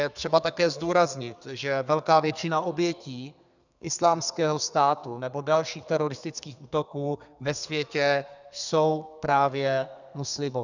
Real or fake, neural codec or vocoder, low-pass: fake; codec, 32 kHz, 1.9 kbps, SNAC; 7.2 kHz